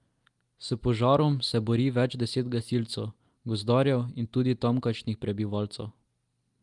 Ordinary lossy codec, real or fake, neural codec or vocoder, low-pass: Opus, 32 kbps; real; none; 10.8 kHz